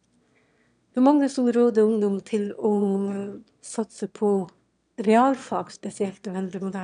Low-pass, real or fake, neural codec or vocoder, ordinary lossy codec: 9.9 kHz; fake; autoencoder, 22.05 kHz, a latent of 192 numbers a frame, VITS, trained on one speaker; none